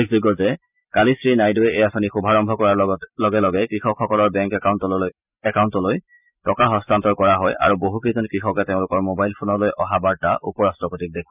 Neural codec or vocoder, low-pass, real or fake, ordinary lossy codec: none; 3.6 kHz; real; none